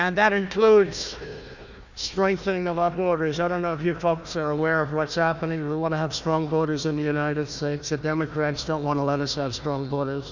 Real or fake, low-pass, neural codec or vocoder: fake; 7.2 kHz; codec, 16 kHz, 1 kbps, FunCodec, trained on Chinese and English, 50 frames a second